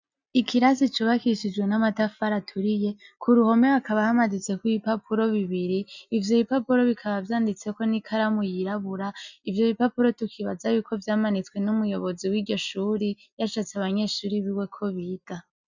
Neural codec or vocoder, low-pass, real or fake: none; 7.2 kHz; real